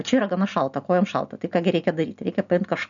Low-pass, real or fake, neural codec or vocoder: 7.2 kHz; real; none